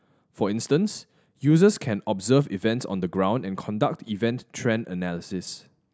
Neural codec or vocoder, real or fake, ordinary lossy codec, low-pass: none; real; none; none